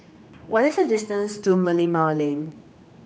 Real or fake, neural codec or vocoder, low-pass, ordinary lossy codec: fake; codec, 16 kHz, 2 kbps, X-Codec, HuBERT features, trained on general audio; none; none